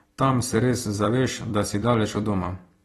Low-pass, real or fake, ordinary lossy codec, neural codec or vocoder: 19.8 kHz; fake; AAC, 32 kbps; vocoder, 44.1 kHz, 128 mel bands every 256 samples, BigVGAN v2